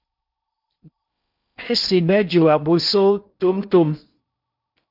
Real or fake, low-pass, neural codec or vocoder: fake; 5.4 kHz; codec, 16 kHz in and 24 kHz out, 0.6 kbps, FocalCodec, streaming, 4096 codes